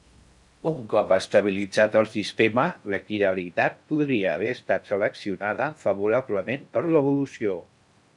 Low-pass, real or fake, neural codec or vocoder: 10.8 kHz; fake; codec, 16 kHz in and 24 kHz out, 0.6 kbps, FocalCodec, streaming, 4096 codes